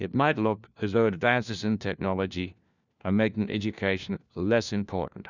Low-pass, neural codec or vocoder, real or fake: 7.2 kHz; codec, 16 kHz, 1 kbps, FunCodec, trained on LibriTTS, 50 frames a second; fake